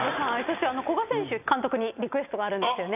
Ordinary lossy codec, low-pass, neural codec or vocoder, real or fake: none; 3.6 kHz; none; real